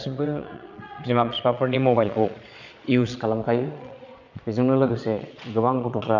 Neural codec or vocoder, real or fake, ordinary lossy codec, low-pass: vocoder, 22.05 kHz, 80 mel bands, Vocos; fake; none; 7.2 kHz